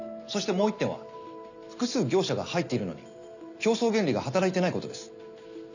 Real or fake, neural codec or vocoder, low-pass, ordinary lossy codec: real; none; 7.2 kHz; none